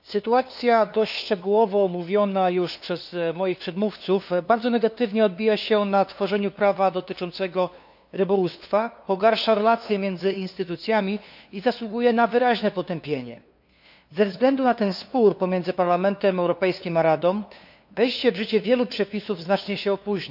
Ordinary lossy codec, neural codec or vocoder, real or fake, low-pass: none; codec, 16 kHz, 2 kbps, FunCodec, trained on LibriTTS, 25 frames a second; fake; 5.4 kHz